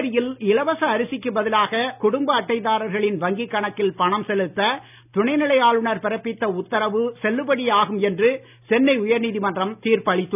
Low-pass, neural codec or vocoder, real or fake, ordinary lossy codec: 3.6 kHz; none; real; none